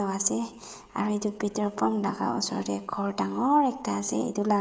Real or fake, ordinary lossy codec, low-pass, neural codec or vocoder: fake; none; none; codec, 16 kHz, 16 kbps, FreqCodec, smaller model